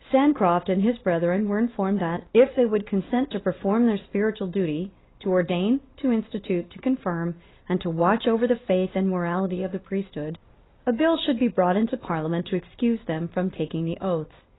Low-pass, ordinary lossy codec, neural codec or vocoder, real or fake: 7.2 kHz; AAC, 16 kbps; none; real